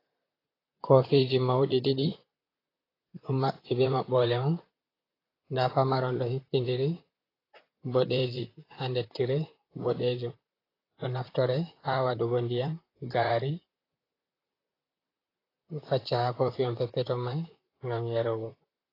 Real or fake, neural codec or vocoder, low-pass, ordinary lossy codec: fake; vocoder, 44.1 kHz, 128 mel bands, Pupu-Vocoder; 5.4 kHz; AAC, 24 kbps